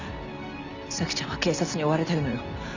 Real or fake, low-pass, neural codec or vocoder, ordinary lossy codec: real; 7.2 kHz; none; none